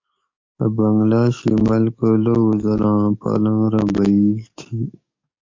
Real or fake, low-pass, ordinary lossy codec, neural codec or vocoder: fake; 7.2 kHz; MP3, 48 kbps; codec, 44.1 kHz, 7.8 kbps, DAC